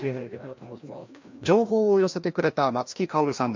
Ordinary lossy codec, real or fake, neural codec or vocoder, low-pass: MP3, 48 kbps; fake; codec, 16 kHz, 1 kbps, FreqCodec, larger model; 7.2 kHz